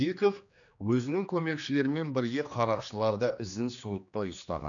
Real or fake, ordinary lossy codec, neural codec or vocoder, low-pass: fake; none; codec, 16 kHz, 2 kbps, X-Codec, HuBERT features, trained on general audio; 7.2 kHz